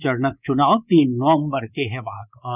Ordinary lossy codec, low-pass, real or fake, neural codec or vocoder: none; 3.6 kHz; fake; codec, 24 kHz, 3.1 kbps, DualCodec